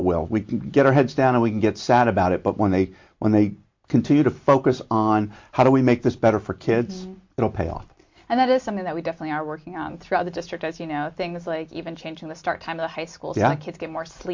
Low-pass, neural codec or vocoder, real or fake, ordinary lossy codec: 7.2 kHz; none; real; MP3, 48 kbps